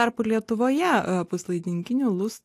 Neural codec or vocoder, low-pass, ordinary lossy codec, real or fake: none; 14.4 kHz; AAC, 64 kbps; real